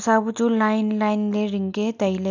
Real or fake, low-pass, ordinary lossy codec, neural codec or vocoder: real; 7.2 kHz; none; none